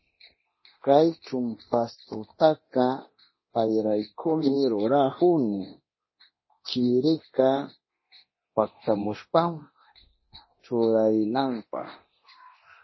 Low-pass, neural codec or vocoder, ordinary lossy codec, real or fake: 7.2 kHz; codec, 24 kHz, 0.9 kbps, DualCodec; MP3, 24 kbps; fake